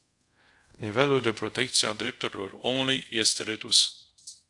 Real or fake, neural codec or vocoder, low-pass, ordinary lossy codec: fake; codec, 24 kHz, 0.5 kbps, DualCodec; 10.8 kHz; AAC, 64 kbps